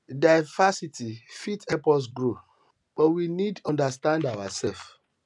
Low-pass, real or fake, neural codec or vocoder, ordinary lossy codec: 10.8 kHz; real; none; none